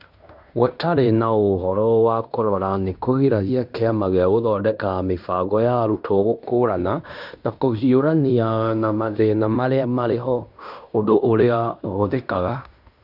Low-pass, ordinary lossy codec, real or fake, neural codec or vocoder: 5.4 kHz; none; fake; codec, 16 kHz in and 24 kHz out, 0.9 kbps, LongCat-Audio-Codec, fine tuned four codebook decoder